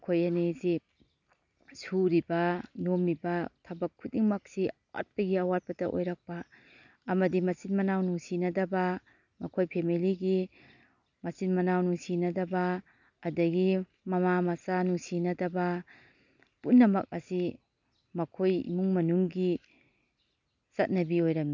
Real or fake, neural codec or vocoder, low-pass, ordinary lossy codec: real; none; 7.2 kHz; none